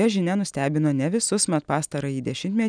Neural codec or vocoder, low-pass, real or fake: none; 9.9 kHz; real